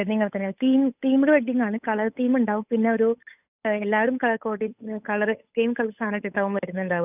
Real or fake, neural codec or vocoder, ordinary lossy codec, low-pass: fake; codec, 16 kHz, 8 kbps, FunCodec, trained on Chinese and English, 25 frames a second; none; 3.6 kHz